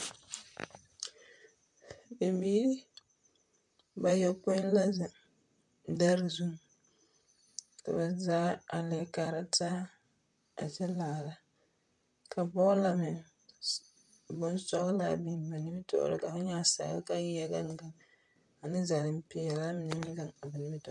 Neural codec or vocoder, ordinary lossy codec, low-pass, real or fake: vocoder, 44.1 kHz, 128 mel bands, Pupu-Vocoder; MP3, 64 kbps; 10.8 kHz; fake